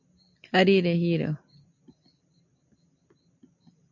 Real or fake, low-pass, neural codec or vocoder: real; 7.2 kHz; none